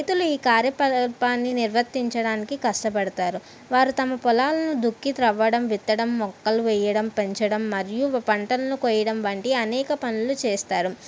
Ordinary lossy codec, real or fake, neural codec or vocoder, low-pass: none; real; none; none